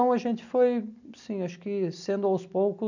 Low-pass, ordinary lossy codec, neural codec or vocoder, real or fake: 7.2 kHz; none; none; real